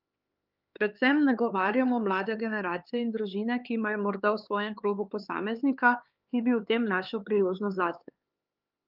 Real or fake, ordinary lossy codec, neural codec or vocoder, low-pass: fake; Opus, 24 kbps; codec, 16 kHz, 4 kbps, X-Codec, HuBERT features, trained on LibriSpeech; 5.4 kHz